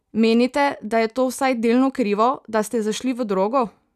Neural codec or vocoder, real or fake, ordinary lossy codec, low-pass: none; real; none; 14.4 kHz